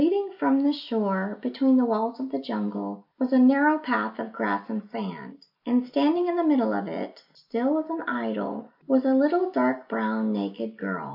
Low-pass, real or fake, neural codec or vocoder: 5.4 kHz; real; none